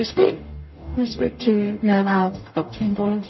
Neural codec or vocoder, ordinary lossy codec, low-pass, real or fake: codec, 44.1 kHz, 0.9 kbps, DAC; MP3, 24 kbps; 7.2 kHz; fake